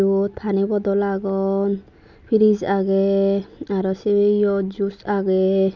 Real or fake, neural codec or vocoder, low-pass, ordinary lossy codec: real; none; 7.2 kHz; none